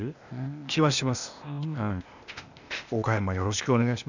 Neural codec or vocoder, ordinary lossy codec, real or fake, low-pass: codec, 16 kHz, 0.8 kbps, ZipCodec; none; fake; 7.2 kHz